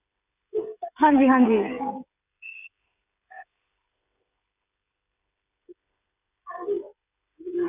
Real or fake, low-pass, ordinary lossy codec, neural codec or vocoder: fake; 3.6 kHz; none; codec, 16 kHz, 16 kbps, FreqCodec, smaller model